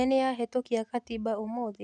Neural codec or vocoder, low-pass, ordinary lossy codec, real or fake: none; none; none; real